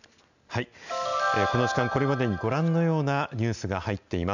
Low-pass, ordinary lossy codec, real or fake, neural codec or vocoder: 7.2 kHz; none; real; none